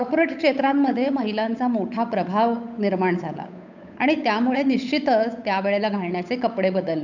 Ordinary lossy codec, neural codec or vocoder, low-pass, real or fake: none; codec, 16 kHz, 8 kbps, FunCodec, trained on Chinese and English, 25 frames a second; 7.2 kHz; fake